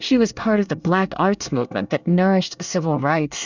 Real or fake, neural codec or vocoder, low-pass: fake; codec, 24 kHz, 1 kbps, SNAC; 7.2 kHz